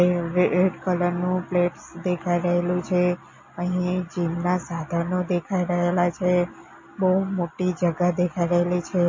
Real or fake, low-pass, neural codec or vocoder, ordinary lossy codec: real; 7.2 kHz; none; MP3, 32 kbps